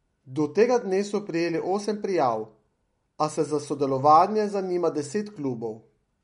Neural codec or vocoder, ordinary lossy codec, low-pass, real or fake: none; MP3, 48 kbps; 19.8 kHz; real